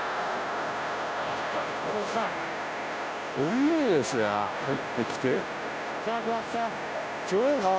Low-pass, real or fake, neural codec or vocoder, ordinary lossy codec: none; fake; codec, 16 kHz, 0.5 kbps, FunCodec, trained on Chinese and English, 25 frames a second; none